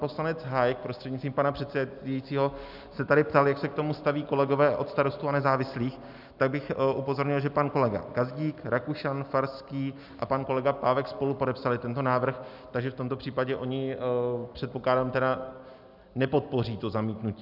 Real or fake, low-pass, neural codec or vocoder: real; 5.4 kHz; none